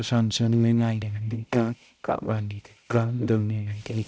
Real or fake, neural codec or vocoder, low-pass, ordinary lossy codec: fake; codec, 16 kHz, 0.5 kbps, X-Codec, HuBERT features, trained on balanced general audio; none; none